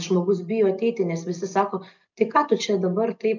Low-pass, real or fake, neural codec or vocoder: 7.2 kHz; real; none